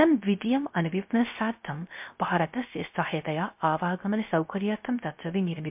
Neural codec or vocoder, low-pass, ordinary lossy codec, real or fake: codec, 16 kHz, 0.3 kbps, FocalCodec; 3.6 kHz; MP3, 32 kbps; fake